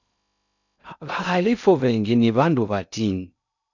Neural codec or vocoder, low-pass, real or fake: codec, 16 kHz in and 24 kHz out, 0.6 kbps, FocalCodec, streaming, 2048 codes; 7.2 kHz; fake